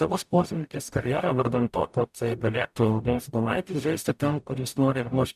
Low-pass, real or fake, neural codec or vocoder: 14.4 kHz; fake; codec, 44.1 kHz, 0.9 kbps, DAC